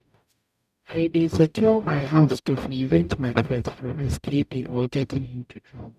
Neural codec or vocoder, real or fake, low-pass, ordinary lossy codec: codec, 44.1 kHz, 0.9 kbps, DAC; fake; 14.4 kHz; none